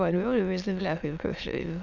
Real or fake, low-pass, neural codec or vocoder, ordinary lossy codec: fake; 7.2 kHz; autoencoder, 22.05 kHz, a latent of 192 numbers a frame, VITS, trained on many speakers; none